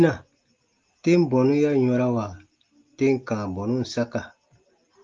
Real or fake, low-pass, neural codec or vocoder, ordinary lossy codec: real; 7.2 kHz; none; Opus, 32 kbps